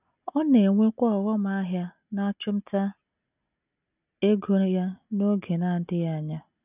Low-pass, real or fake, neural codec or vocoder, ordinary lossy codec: 3.6 kHz; real; none; none